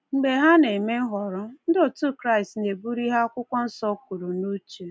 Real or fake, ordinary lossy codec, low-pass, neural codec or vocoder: real; none; none; none